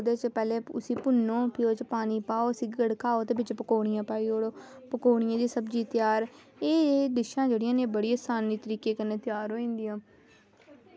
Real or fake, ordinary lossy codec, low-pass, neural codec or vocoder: real; none; none; none